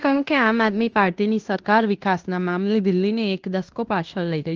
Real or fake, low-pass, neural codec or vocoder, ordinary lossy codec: fake; 7.2 kHz; codec, 16 kHz in and 24 kHz out, 0.9 kbps, LongCat-Audio-Codec, fine tuned four codebook decoder; Opus, 24 kbps